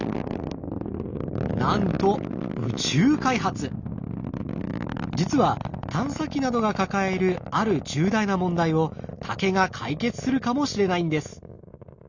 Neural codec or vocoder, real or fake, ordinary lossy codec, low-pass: none; real; none; 7.2 kHz